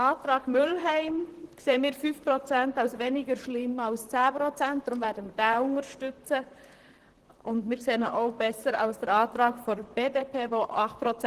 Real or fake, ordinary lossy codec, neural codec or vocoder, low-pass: fake; Opus, 16 kbps; vocoder, 44.1 kHz, 128 mel bands, Pupu-Vocoder; 14.4 kHz